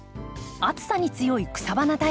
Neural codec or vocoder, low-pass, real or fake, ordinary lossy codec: none; none; real; none